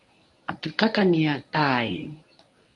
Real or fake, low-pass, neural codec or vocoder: fake; 10.8 kHz; codec, 24 kHz, 0.9 kbps, WavTokenizer, medium speech release version 1